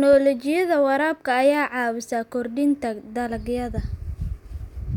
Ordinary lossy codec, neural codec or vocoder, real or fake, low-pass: none; none; real; 19.8 kHz